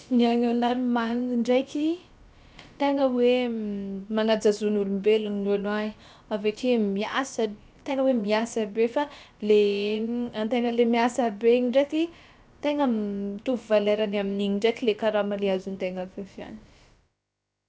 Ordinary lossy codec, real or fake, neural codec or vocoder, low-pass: none; fake; codec, 16 kHz, about 1 kbps, DyCAST, with the encoder's durations; none